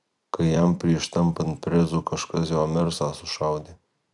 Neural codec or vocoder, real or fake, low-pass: vocoder, 44.1 kHz, 128 mel bands every 256 samples, BigVGAN v2; fake; 10.8 kHz